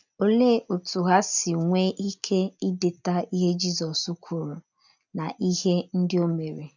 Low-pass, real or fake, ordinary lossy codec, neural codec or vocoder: 7.2 kHz; real; none; none